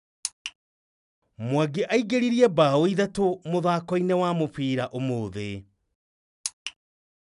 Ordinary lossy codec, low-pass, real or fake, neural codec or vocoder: none; 10.8 kHz; real; none